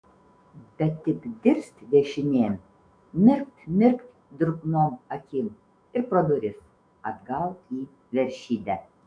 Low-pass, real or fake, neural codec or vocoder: 9.9 kHz; fake; autoencoder, 48 kHz, 128 numbers a frame, DAC-VAE, trained on Japanese speech